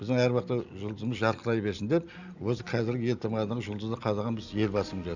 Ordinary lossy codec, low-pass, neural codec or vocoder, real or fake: none; 7.2 kHz; none; real